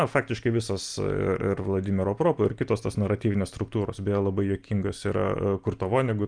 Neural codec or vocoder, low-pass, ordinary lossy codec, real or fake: none; 9.9 kHz; Opus, 32 kbps; real